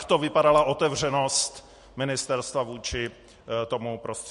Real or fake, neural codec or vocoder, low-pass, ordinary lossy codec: real; none; 14.4 kHz; MP3, 48 kbps